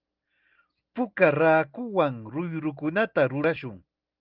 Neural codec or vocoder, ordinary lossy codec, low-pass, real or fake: none; Opus, 32 kbps; 5.4 kHz; real